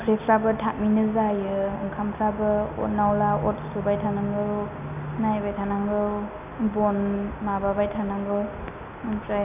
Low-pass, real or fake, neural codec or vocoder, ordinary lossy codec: 3.6 kHz; real; none; none